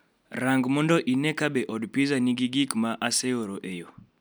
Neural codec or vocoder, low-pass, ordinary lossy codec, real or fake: none; none; none; real